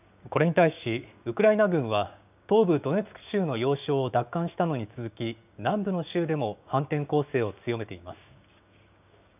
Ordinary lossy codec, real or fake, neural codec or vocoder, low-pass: none; fake; codec, 16 kHz, 6 kbps, DAC; 3.6 kHz